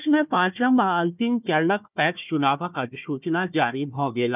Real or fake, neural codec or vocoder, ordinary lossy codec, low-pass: fake; codec, 16 kHz, 1 kbps, FunCodec, trained on Chinese and English, 50 frames a second; none; 3.6 kHz